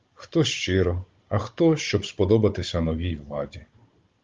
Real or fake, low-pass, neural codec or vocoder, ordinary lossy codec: fake; 7.2 kHz; codec, 16 kHz, 16 kbps, FunCodec, trained on Chinese and English, 50 frames a second; Opus, 32 kbps